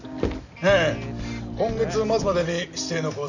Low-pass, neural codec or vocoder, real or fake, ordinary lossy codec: 7.2 kHz; none; real; none